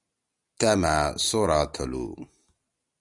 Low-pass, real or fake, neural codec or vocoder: 10.8 kHz; real; none